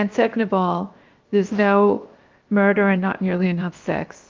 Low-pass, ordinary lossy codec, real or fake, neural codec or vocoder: 7.2 kHz; Opus, 24 kbps; fake; codec, 16 kHz, 0.7 kbps, FocalCodec